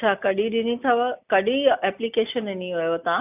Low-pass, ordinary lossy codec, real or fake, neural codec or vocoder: 3.6 kHz; none; real; none